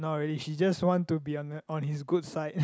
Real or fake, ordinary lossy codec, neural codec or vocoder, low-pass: real; none; none; none